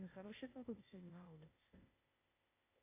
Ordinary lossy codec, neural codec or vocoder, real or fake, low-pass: AAC, 24 kbps; codec, 16 kHz, 0.8 kbps, ZipCodec; fake; 3.6 kHz